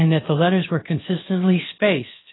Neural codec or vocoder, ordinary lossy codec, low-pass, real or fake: codec, 16 kHz, about 1 kbps, DyCAST, with the encoder's durations; AAC, 16 kbps; 7.2 kHz; fake